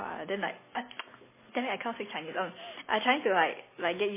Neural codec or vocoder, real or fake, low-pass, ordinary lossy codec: none; real; 3.6 kHz; MP3, 16 kbps